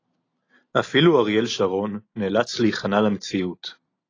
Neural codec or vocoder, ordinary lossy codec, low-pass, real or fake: none; AAC, 32 kbps; 7.2 kHz; real